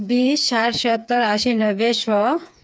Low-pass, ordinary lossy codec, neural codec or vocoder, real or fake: none; none; codec, 16 kHz, 4 kbps, FreqCodec, smaller model; fake